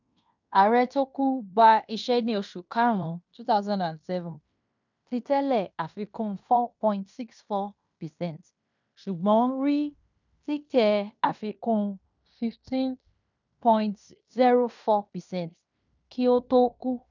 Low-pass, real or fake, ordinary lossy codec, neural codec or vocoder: 7.2 kHz; fake; none; codec, 16 kHz in and 24 kHz out, 0.9 kbps, LongCat-Audio-Codec, fine tuned four codebook decoder